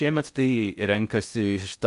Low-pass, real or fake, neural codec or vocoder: 10.8 kHz; fake; codec, 16 kHz in and 24 kHz out, 0.6 kbps, FocalCodec, streaming, 4096 codes